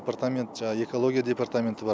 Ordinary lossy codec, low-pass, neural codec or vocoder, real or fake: none; none; none; real